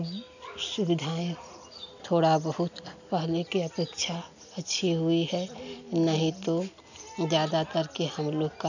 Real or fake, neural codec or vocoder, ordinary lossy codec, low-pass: real; none; none; 7.2 kHz